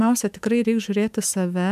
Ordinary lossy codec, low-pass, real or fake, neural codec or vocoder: MP3, 96 kbps; 14.4 kHz; fake; autoencoder, 48 kHz, 128 numbers a frame, DAC-VAE, trained on Japanese speech